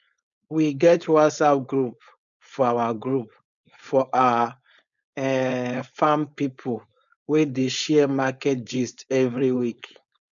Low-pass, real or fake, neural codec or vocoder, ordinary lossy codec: 7.2 kHz; fake; codec, 16 kHz, 4.8 kbps, FACodec; none